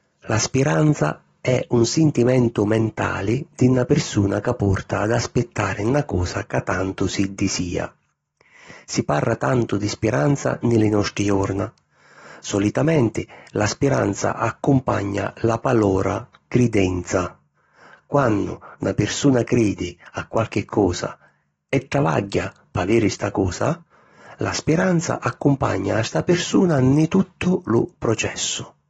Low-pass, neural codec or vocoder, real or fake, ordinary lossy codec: 19.8 kHz; none; real; AAC, 24 kbps